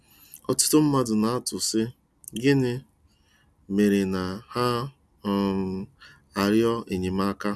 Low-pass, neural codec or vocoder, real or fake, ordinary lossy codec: none; none; real; none